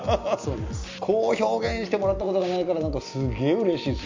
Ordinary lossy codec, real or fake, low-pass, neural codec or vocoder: MP3, 48 kbps; real; 7.2 kHz; none